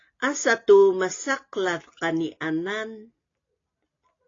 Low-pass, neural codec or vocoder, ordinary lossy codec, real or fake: 7.2 kHz; none; AAC, 32 kbps; real